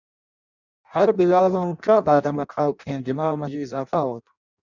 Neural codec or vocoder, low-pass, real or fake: codec, 16 kHz in and 24 kHz out, 0.6 kbps, FireRedTTS-2 codec; 7.2 kHz; fake